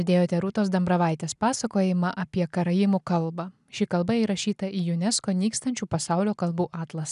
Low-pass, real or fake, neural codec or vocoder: 10.8 kHz; real; none